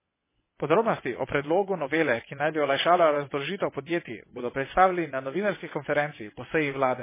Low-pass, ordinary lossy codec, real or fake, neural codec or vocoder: 3.6 kHz; MP3, 16 kbps; fake; vocoder, 22.05 kHz, 80 mel bands, WaveNeXt